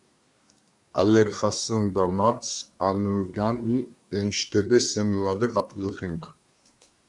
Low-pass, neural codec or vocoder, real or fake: 10.8 kHz; codec, 24 kHz, 1 kbps, SNAC; fake